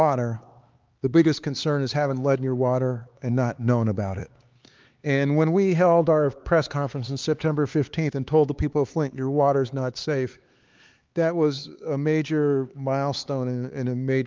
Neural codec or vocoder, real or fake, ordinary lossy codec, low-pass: codec, 16 kHz, 4 kbps, X-Codec, HuBERT features, trained on LibriSpeech; fake; Opus, 32 kbps; 7.2 kHz